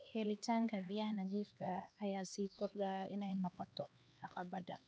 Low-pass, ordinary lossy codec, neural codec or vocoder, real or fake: none; none; codec, 16 kHz, 2 kbps, X-Codec, HuBERT features, trained on LibriSpeech; fake